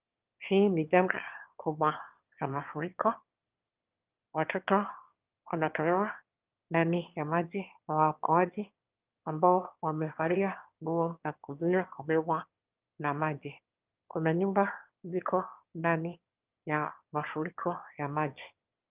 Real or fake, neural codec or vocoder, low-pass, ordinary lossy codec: fake; autoencoder, 22.05 kHz, a latent of 192 numbers a frame, VITS, trained on one speaker; 3.6 kHz; Opus, 32 kbps